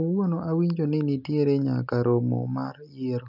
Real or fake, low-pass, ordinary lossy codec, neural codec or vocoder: real; 5.4 kHz; none; none